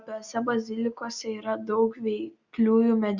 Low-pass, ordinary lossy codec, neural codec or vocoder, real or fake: 7.2 kHz; Opus, 64 kbps; none; real